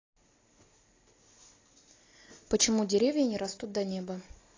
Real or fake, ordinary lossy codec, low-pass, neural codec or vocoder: real; AAC, 32 kbps; 7.2 kHz; none